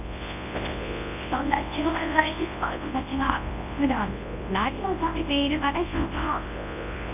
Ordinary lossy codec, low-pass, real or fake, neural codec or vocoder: none; 3.6 kHz; fake; codec, 24 kHz, 0.9 kbps, WavTokenizer, large speech release